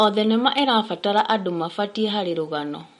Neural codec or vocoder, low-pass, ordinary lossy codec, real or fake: none; 19.8 kHz; MP3, 48 kbps; real